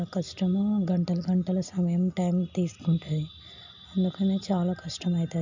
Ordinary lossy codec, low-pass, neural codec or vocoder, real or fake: none; 7.2 kHz; none; real